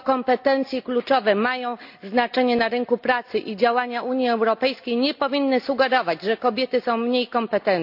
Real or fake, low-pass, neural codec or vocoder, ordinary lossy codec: real; 5.4 kHz; none; AAC, 48 kbps